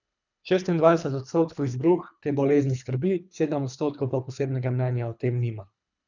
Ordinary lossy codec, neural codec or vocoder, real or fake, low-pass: none; codec, 24 kHz, 3 kbps, HILCodec; fake; 7.2 kHz